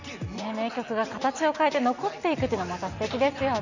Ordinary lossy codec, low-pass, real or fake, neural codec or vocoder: none; 7.2 kHz; real; none